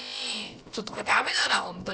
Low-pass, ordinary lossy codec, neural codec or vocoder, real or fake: none; none; codec, 16 kHz, about 1 kbps, DyCAST, with the encoder's durations; fake